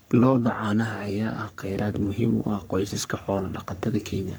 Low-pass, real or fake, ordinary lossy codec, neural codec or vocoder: none; fake; none; codec, 44.1 kHz, 3.4 kbps, Pupu-Codec